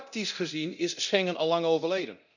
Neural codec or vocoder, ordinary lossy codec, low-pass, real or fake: codec, 24 kHz, 0.9 kbps, DualCodec; none; 7.2 kHz; fake